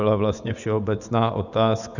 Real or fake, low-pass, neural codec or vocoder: fake; 7.2 kHz; vocoder, 24 kHz, 100 mel bands, Vocos